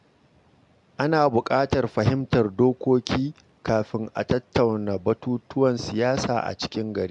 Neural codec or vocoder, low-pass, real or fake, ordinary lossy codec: none; 10.8 kHz; real; MP3, 64 kbps